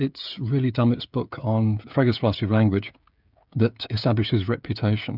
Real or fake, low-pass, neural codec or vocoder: fake; 5.4 kHz; codec, 16 kHz, 16 kbps, FreqCodec, smaller model